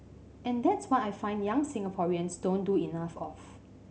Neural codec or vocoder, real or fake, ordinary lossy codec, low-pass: none; real; none; none